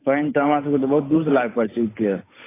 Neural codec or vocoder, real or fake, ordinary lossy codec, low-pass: codec, 16 kHz, 8 kbps, FunCodec, trained on Chinese and English, 25 frames a second; fake; AAC, 16 kbps; 3.6 kHz